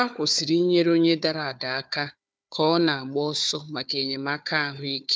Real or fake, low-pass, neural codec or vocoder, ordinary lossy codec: fake; none; codec, 16 kHz, 4 kbps, FunCodec, trained on Chinese and English, 50 frames a second; none